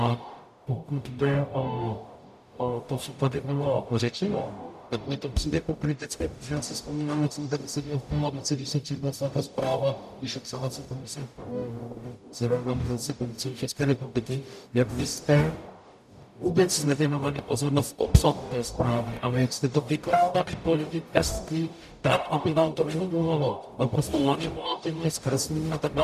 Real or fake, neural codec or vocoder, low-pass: fake; codec, 44.1 kHz, 0.9 kbps, DAC; 14.4 kHz